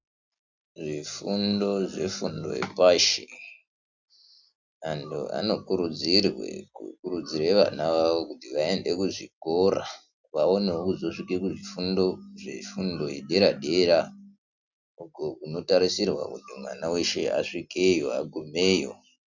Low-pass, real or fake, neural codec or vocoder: 7.2 kHz; real; none